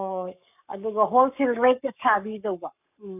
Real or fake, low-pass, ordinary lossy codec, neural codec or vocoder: fake; 3.6 kHz; none; codec, 44.1 kHz, 7.8 kbps, Pupu-Codec